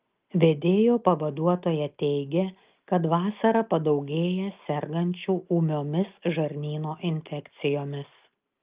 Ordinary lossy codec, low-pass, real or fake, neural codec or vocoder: Opus, 32 kbps; 3.6 kHz; real; none